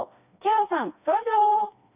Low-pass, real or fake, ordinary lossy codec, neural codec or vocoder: 3.6 kHz; fake; none; codec, 16 kHz, 2 kbps, FreqCodec, smaller model